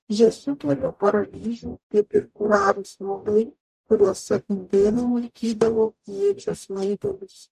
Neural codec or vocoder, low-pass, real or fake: codec, 44.1 kHz, 0.9 kbps, DAC; 14.4 kHz; fake